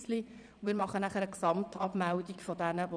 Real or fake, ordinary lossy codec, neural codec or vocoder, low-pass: fake; none; vocoder, 22.05 kHz, 80 mel bands, Vocos; 9.9 kHz